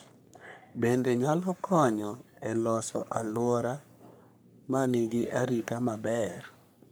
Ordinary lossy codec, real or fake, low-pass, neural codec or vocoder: none; fake; none; codec, 44.1 kHz, 3.4 kbps, Pupu-Codec